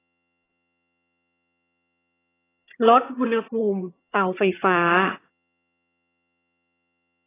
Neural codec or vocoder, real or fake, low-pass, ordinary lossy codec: vocoder, 22.05 kHz, 80 mel bands, HiFi-GAN; fake; 3.6 kHz; AAC, 16 kbps